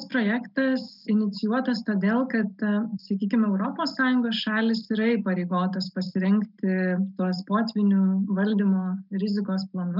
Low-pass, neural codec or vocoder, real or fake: 5.4 kHz; none; real